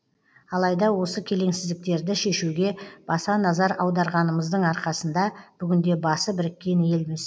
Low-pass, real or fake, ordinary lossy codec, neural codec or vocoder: none; real; none; none